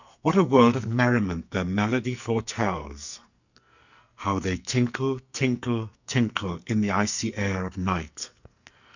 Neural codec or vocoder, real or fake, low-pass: codec, 44.1 kHz, 2.6 kbps, SNAC; fake; 7.2 kHz